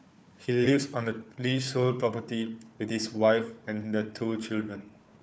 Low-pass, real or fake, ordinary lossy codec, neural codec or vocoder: none; fake; none; codec, 16 kHz, 4 kbps, FunCodec, trained on Chinese and English, 50 frames a second